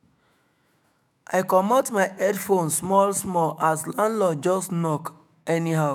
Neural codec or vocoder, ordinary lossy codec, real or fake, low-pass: autoencoder, 48 kHz, 128 numbers a frame, DAC-VAE, trained on Japanese speech; none; fake; none